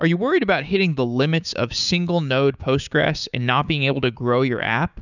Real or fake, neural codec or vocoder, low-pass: fake; codec, 16 kHz, 6 kbps, DAC; 7.2 kHz